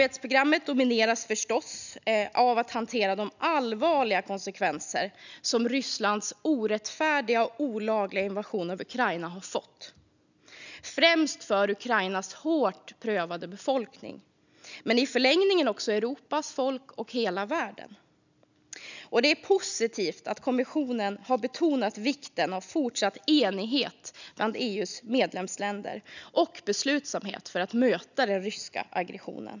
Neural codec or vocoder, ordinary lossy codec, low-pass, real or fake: none; none; 7.2 kHz; real